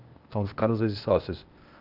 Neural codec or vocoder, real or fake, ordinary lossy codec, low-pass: codec, 16 kHz, 0.8 kbps, ZipCodec; fake; Opus, 32 kbps; 5.4 kHz